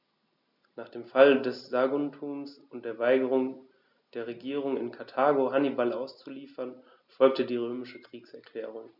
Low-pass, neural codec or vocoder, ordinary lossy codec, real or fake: 5.4 kHz; none; none; real